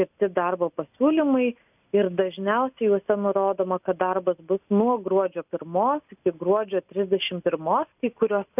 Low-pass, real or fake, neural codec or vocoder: 3.6 kHz; real; none